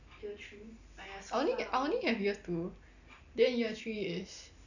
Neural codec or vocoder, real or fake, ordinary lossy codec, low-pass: none; real; none; 7.2 kHz